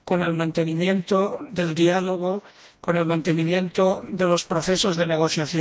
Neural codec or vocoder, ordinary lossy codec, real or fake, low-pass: codec, 16 kHz, 1 kbps, FreqCodec, smaller model; none; fake; none